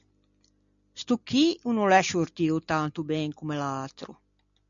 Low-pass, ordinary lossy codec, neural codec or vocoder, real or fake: 7.2 kHz; MP3, 96 kbps; none; real